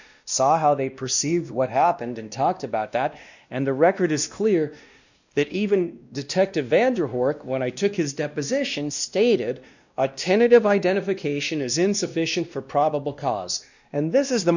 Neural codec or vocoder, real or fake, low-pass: codec, 16 kHz, 1 kbps, X-Codec, WavLM features, trained on Multilingual LibriSpeech; fake; 7.2 kHz